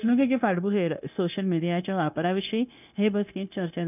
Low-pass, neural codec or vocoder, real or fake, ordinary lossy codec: 3.6 kHz; codec, 16 kHz, 0.9 kbps, LongCat-Audio-Codec; fake; none